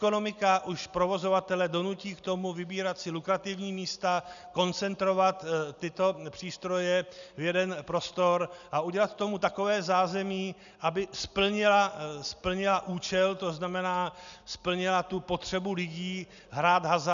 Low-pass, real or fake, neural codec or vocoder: 7.2 kHz; real; none